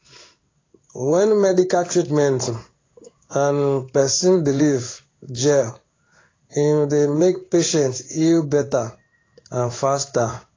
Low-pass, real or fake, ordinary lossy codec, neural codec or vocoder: 7.2 kHz; fake; AAC, 32 kbps; codec, 16 kHz in and 24 kHz out, 1 kbps, XY-Tokenizer